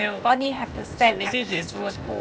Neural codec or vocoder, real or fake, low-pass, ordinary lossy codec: codec, 16 kHz, 0.8 kbps, ZipCodec; fake; none; none